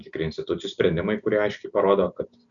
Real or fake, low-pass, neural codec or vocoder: real; 7.2 kHz; none